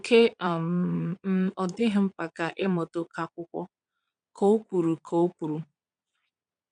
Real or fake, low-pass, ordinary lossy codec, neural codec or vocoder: fake; 9.9 kHz; none; vocoder, 22.05 kHz, 80 mel bands, Vocos